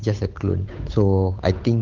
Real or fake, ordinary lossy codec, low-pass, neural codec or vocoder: fake; Opus, 24 kbps; 7.2 kHz; codec, 16 kHz, 8 kbps, FunCodec, trained on Chinese and English, 25 frames a second